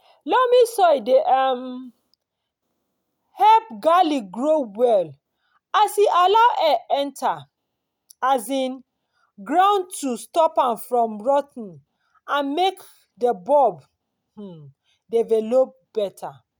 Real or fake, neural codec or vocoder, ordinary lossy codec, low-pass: real; none; none; none